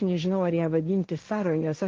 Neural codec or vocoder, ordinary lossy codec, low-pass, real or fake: codec, 16 kHz, 1.1 kbps, Voila-Tokenizer; Opus, 24 kbps; 7.2 kHz; fake